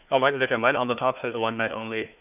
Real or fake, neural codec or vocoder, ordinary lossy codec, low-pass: fake; codec, 16 kHz, 1 kbps, FunCodec, trained on Chinese and English, 50 frames a second; none; 3.6 kHz